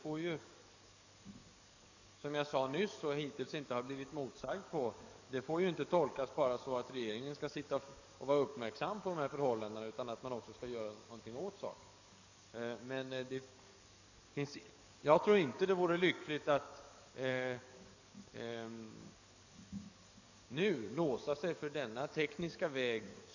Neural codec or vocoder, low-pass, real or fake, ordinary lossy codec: codec, 44.1 kHz, 7.8 kbps, DAC; 7.2 kHz; fake; none